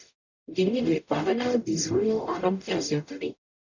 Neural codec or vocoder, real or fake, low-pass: codec, 44.1 kHz, 0.9 kbps, DAC; fake; 7.2 kHz